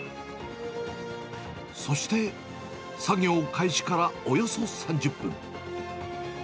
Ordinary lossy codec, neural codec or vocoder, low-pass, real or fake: none; none; none; real